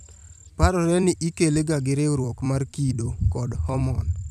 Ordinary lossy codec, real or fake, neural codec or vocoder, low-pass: none; fake; vocoder, 44.1 kHz, 128 mel bands every 256 samples, BigVGAN v2; 14.4 kHz